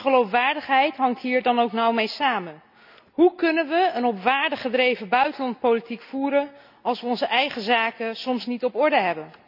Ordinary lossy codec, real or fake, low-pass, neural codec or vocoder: none; real; 5.4 kHz; none